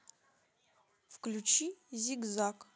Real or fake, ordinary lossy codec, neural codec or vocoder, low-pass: real; none; none; none